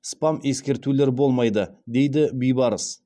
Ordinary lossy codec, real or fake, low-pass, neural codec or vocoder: none; real; none; none